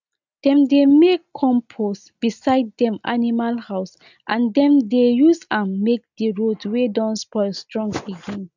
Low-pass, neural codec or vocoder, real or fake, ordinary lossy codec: 7.2 kHz; none; real; none